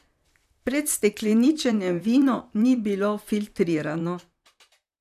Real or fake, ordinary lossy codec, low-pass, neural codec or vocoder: fake; none; 14.4 kHz; vocoder, 44.1 kHz, 128 mel bands, Pupu-Vocoder